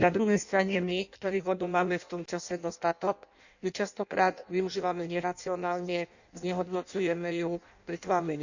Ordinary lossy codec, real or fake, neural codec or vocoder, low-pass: none; fake; codec, 16 kHz in and 24 kHz out, 0.6 kbps, FireRedTTS-2 codec; 7.2 kHz